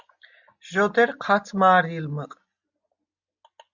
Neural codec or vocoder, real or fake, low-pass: none; real; 7.2 kHz